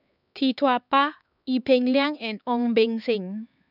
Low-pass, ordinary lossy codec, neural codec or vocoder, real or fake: 5.4 kHz; none; codec, 16 kHz, 4 kbps, X-Codec, HuBERT features, trained on LibriSpeech; fake